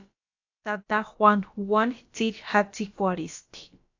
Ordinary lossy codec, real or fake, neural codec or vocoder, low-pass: AAC, 48 kbps; fake; codec, 16 kHz, about 1 kbps, DyCAST, with the encoder's durations; 7.2 kHz